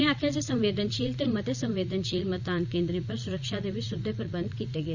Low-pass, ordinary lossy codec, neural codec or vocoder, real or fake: 7.2 kHz; none; vocoder, 44.1 kHz, 80 mel bands, Vocos; fake